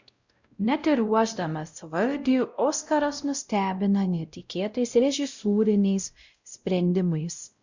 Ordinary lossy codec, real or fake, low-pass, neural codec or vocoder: Opus, 64 kbps; fake; 7.2 kHz; codec, 16 kHz, 0.5 kbps, X-Codec, WavLM features, trained on Multilingual LibriSpeech